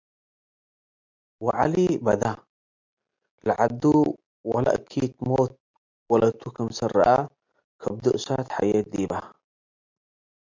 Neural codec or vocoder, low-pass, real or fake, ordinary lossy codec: none; 7.2 kHz; real; MP3, 64 kbps